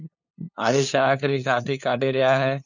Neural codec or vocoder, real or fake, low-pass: codec, 16 kHz, 2 kbps, FunCodec, trained on LibriTTS, 25 frames a second; fake; 7.2 kHz